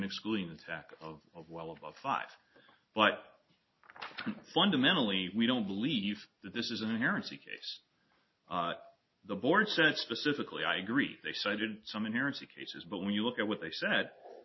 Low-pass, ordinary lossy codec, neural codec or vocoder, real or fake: 7.2 kHz; MP3, 24 kbps; none; real